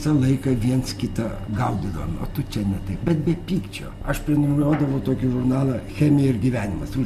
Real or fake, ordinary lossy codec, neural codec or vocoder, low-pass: real; Opus, 64 kbps; none; 14.4 kHz